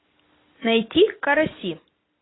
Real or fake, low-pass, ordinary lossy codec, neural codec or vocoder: real; 7.2 kHz; AAC, 16 kbps; none